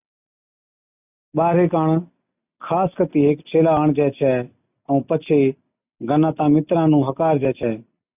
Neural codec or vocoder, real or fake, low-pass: none; real; 3.6 kHz